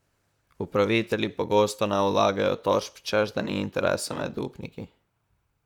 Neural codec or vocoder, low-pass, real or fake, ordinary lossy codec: vocoder, 44.1 kHz, 128 mel bands, Pupu-Vocoder; 19.8 kHz; fake; none